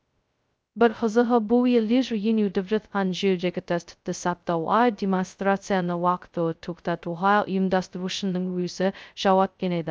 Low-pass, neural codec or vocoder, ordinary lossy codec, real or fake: none; codec, 16 kHz, 0.2 kbps, FocalCodec; none; fake